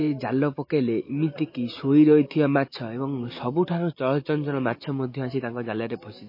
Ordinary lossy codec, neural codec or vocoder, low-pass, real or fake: MP3, 24 kbps; none; 5.4 kHz; real